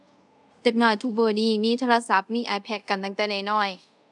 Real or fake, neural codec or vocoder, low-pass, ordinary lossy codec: fake; codec, 24 kHz, 0.5 kbps, DualCodec; 10.8 kHz; none